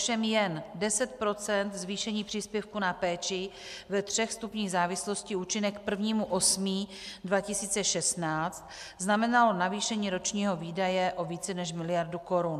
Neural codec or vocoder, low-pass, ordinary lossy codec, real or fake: none; 14.4 kHz; MP3, 96 kbps; real